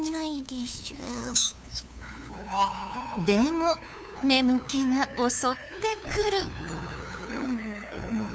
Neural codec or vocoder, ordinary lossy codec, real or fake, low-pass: codec, 16 kHz, 2 kbps, FunCodec, trained on LibriTTS, 25 frames a second; none; fake; none